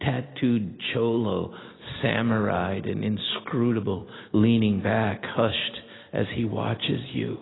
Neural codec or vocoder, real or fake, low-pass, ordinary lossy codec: none; real; 7.2 kHz; AAC, 16 kbps